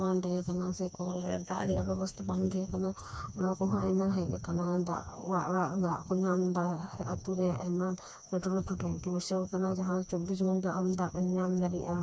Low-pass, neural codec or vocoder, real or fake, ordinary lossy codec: none; codec, 16 kHz, 2 kbps, FreqCodec, smaller model; fake; none